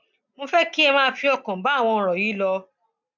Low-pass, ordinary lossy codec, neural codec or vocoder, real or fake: 7.2 kHz; none; none; real